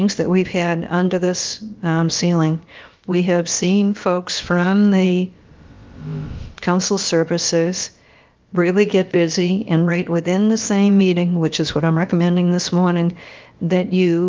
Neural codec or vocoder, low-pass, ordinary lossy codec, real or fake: codec, 16 kHz, about 1 kbps, DyCAST, with the encoder's durations; 7.2 kHz; Opus, 32 kbps; fake